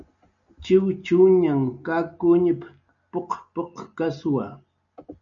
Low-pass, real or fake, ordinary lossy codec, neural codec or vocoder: 7.2 kHz; real; MP3, 96 kbps; none